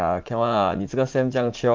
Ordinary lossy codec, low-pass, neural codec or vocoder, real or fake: Opus, 24 kbps; 7.2 kHz; vocoder, 44.1 kHz, 80 mel bands, Vocos; fake